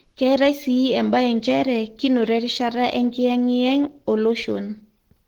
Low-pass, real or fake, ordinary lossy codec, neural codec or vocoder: 19.8 kHz; fake; Opus, 16 kbps; codec, 44.1 kHz, 7.8 kbps, Pupu-Codec